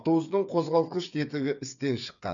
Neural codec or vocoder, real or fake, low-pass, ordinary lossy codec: codec, 16 kHz, 8 kbps, FreqCodec, smaller model; fake; 7.2 kHz; none